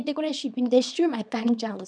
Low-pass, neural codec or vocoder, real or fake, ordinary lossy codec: 9.9 kHz; codec, 24 kHz, 0.9 kbps, WavTokenizer, small release; fake; none